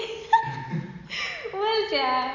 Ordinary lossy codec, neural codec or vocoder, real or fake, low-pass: none; none; real; 7.2 kHz